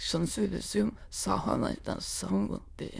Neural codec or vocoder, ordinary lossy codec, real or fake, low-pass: autoencoder, 22.05 kHz, a latent of 192 numbers a frame, VITS, trained on many speakers; none; fake; none